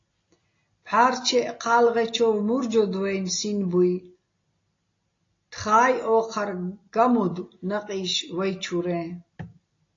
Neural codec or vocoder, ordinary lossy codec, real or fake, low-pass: none; AAC, 32 kbps; real; 7.2 kHz